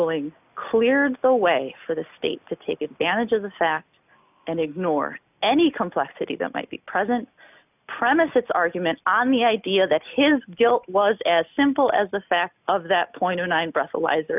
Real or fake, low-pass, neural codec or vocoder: real; 3.6 kHz; none